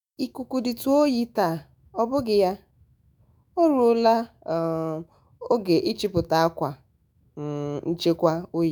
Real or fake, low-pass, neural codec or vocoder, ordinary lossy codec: fake; none; autoencoder, 48 kHz, 128 numbers a frame, DAC-VAE, trained on Japanese speech; none